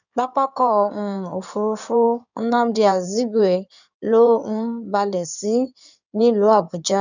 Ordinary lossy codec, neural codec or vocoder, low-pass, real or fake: none; codec, 16 kHz in and 24 kHz out, 2.2 kbps, FireRedTTS-2 codec; 7.2 kHz; fake